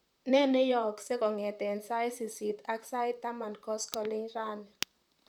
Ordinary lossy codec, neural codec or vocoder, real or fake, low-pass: none; vocoder, 44.1 kHz, 128 mel bands, Pupu-Vocoder; fake; 19.8 kHz